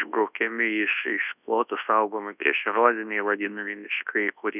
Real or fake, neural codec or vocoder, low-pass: fake; codec, 24 kHz, 0.9 kbps, WavTokenizer, large speech release; 3.6 kHz